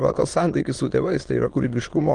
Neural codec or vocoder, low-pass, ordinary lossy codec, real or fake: autoencoder, 22.05 kHz, a latent of 192 numbers a frame, VITS, trained on many speakers; 9.9 kHz; Opus, 24 kbps; fake